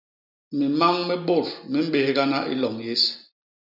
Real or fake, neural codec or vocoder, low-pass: real; none; 5.4 kHz